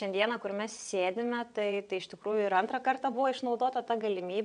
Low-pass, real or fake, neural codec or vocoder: 9.9 kHz; fake; vocoder, 22.05 kHz, 80 mel bands, WaveNeXt